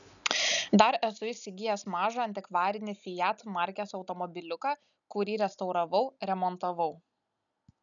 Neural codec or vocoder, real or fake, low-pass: none; real; 7.2 kHz